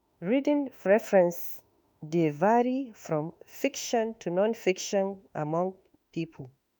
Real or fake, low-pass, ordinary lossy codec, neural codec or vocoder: fake; none; none; autoencoder, 48 kHz, 32 numbers a frame, DAC-VAE, trained on Japanese speech